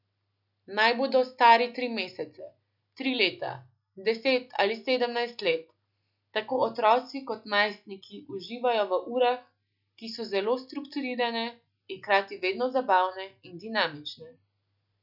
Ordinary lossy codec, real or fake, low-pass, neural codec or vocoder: none; real; 5.4 kHz; none